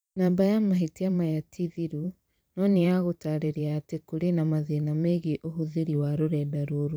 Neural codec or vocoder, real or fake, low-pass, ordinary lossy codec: vocoder, 44.1 kHz, 128 mel bands every 512 samples, BigVGAN v2; fake; none; none